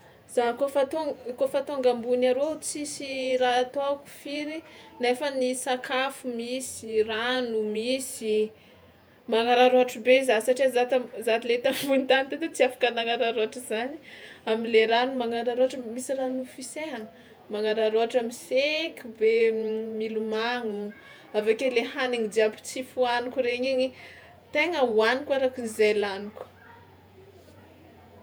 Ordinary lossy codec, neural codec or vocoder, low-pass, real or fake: none; vocoder, 48 kHz, 128 mel bands, Vocos; none; fake